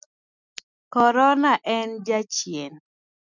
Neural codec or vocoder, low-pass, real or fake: none; 7.2 kHz; real